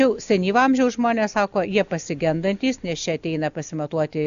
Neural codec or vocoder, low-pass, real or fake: none; 7.2 kHz; real